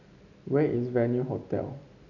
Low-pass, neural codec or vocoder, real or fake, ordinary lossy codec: 7.2 kHz; none; real; none